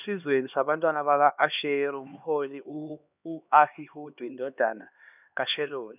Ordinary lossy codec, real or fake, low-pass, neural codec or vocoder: none; fake; 3.6 kHz; codec, 16 kHz, 2 kbps, X-Codec, HuBERT features, trained on LibriSpeech